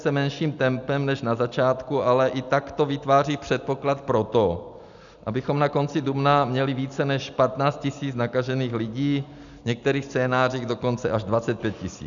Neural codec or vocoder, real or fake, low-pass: none; real; 7.2 kHz